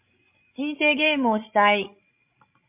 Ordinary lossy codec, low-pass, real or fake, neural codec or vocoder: MP3, 32 kbps; 3.6 kHz; fake; codec, 16 kHz, 16 kbps, FreqCodec, larger model